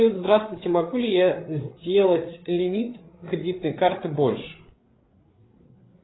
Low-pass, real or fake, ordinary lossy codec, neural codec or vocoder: 7.2 kHz; fake; AAC, 16 kbps; codec, 16 kHz, 8 kbps, FreqCodec, larger model